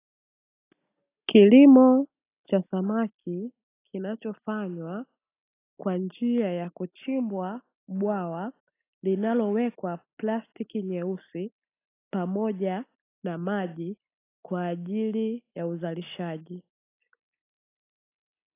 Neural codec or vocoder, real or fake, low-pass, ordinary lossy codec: none; real; 3.6 kHz; AAC, 24 kbps